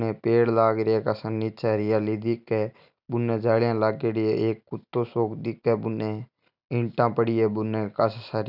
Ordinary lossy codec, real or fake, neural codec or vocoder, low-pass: none; real; none; 5.4 kHz